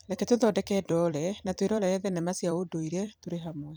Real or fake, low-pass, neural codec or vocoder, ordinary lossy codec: fake; none; vocoder, 44.1 kHz, 128 mel bands every 256 samples, BigVGAN v2; none